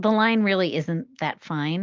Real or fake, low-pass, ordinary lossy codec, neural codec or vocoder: real; 7.2 kHz; Opus, 32 kbps; none